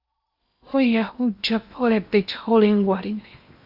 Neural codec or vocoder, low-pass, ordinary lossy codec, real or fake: codec, 16 kHz in and 24 kHz out, 0.8 kbps, FocalCodec, streaming, 65536 codes; 5.4 kHz; Opus, 64 kbps; fake